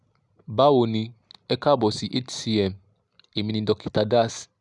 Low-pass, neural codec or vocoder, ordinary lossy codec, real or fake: 10.8 kHz; none; none; real